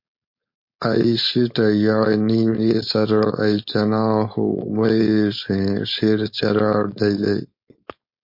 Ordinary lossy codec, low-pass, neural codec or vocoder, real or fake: MP3, 32 kbps; 5.4 kHz; codec, 16 kHz, 4.8 kbps, FACodec; fake